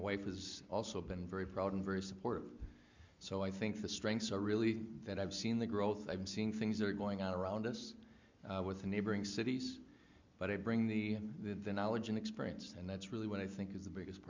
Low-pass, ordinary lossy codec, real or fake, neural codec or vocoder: 7.2 kHz; MP3, 64 kbps; real; none